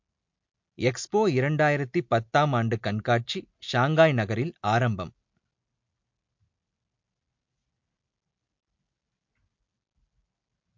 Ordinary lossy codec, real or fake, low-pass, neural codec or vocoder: MP3, 48 kbps; real; 7.2 kHz; none